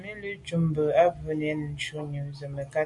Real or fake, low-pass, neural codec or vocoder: real; 10.8 kHz; none